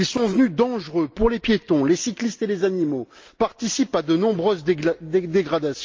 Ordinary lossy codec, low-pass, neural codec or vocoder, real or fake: Opus, 24 kbps; 7.2 kHz; none; real